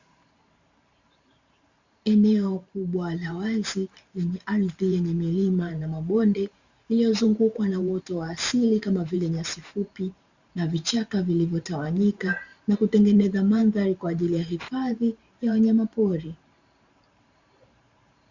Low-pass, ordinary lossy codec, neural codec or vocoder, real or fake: 7.2 kHz; Opus, 64 kbps; vocoder, 44.1 kHz, 128 mel bands every 512 samples, BigVGAN v2; fake